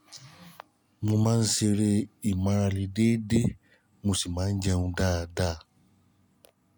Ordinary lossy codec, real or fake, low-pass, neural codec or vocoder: none; real; none; none